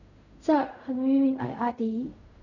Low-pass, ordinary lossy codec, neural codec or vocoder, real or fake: 7.2 kHz; none; codec, 16 kHz in and 24 kHz out, 0.4 kbps, LongCat-Audio-Codec, fine tuned four codebook decoder; fake